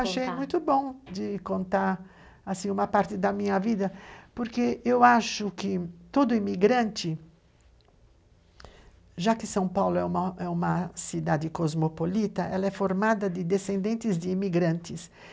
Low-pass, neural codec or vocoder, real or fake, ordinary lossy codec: none; none; real; none